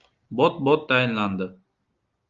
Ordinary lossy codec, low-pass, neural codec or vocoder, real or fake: Opus, 32 kbps; 7.2 kHz; none; real